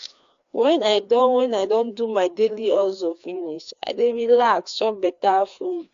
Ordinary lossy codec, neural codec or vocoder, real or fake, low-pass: AAC, 64 kbps; codec, 16 kHz, 2 kbps, FreqCodec, larger model; fake; 7.2 kHz